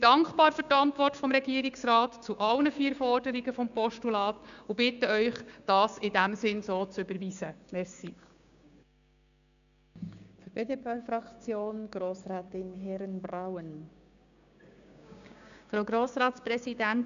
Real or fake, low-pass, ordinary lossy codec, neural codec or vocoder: fake; 7.2 kHz; none; codec, 16 kHz, 6 kbps, DAC